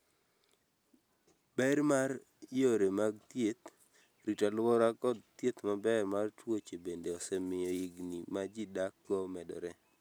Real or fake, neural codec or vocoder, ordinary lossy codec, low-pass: real; none; none; none